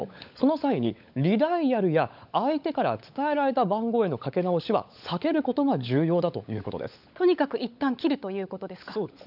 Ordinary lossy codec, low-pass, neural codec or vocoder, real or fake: none; 5.4 kHz; codec, 16 kHz, 16 kbps, FunCodec, trained on LibriTTS, 50 frames a second; fake